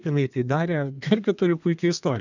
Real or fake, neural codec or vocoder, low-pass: fake; codec, 32 kHz, 1.9 kbps, SNAC; 7.2 kHz